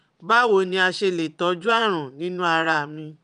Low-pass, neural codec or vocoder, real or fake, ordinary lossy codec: 9.9 kHz; vocoder, 22.05 kHz, 80 mel bands, Vocos; fake; none